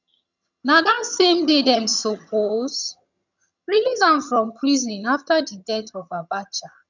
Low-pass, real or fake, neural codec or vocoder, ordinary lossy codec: 7.2 kHz; fake; vocoder, 22.05 kHz, 80 mel bands, HiFi-GAN; none